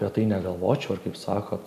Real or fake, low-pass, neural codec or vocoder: real; 14.4 kHz; none